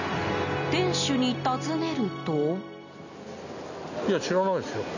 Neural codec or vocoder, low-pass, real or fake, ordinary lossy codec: none; 7.2 kHz; real; none